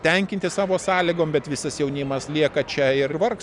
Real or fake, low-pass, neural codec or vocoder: real; 10.8 kHz; none